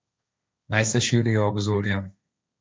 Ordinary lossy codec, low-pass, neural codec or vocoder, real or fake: none; none; codec, 16 kHz, 1.1 kbps, Voila-Tokenizer; fake